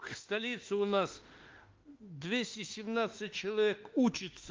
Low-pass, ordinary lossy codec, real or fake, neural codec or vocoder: 7.2 kHz; Opus, 16 kbps; fake; autoencoder, 48 kHz, 32 numbers a frame, DAC-VAE, trained on Japanese speech